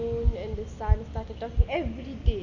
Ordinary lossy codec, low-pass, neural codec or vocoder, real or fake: none; 7.2 kHz; none; real